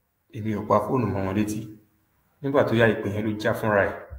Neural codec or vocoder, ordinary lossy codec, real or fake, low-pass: autoencoder, 48 kHz, 128 numbers a frame, DAC-VAE, trained on Japanese speech; AAC, 48 kbps; fake; 19.8 kHz